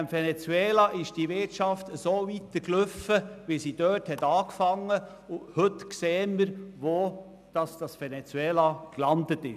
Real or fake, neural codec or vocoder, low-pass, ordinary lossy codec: real; none; 14.4 kHz; none